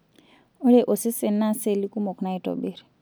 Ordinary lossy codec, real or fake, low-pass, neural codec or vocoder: none; real; none; none